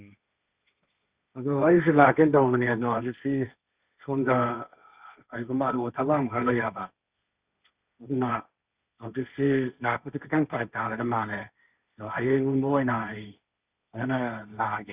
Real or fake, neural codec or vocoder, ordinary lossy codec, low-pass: fake; codec, 16 kHz, 1.1 kbps, Voila-Tokenizer; Opus, 64 kbps; 3.6 kHz